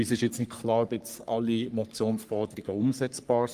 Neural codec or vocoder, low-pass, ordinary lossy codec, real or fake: codec, 44.1 kHz, 3.4 kbps, Pupu-Codec; 14.4 kHz; Opus, 32 kbps; fake